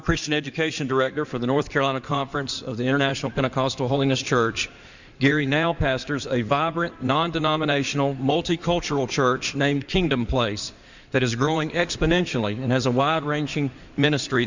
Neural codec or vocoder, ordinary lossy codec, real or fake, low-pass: codec, 16 kHz in and 24 kHz out, 2.2 kbps, FireRedTTS-2 codec; Opus, 64 kbps; fake; 7.2 kHz